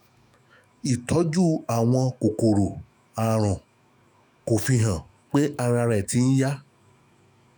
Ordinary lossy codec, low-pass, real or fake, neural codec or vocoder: none; none; fake; autoencoder, 48 kHz, 128 numbers a frame, DAC-VAE, trained on Japanese speech